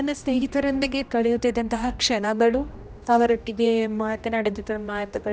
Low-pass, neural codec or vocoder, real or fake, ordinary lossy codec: none; codec, 16 kHz, 1 kbps, X-Codec, HuBERT features, trained on general audio; fake; none